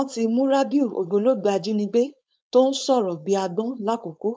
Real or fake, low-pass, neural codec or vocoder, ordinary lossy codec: fake; none; codec, 16 kHz, 4.8 kbps, FACodec; none